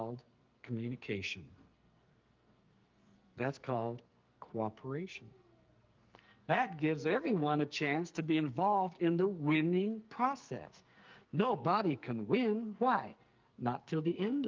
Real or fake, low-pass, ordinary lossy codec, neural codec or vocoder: fake; 7.2 kHz; Opus, 24 kbps; codec, 44.1 kHz, 2.6 kbps, SNAC